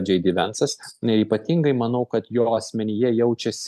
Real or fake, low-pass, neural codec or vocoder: real; 14.4 kHz; none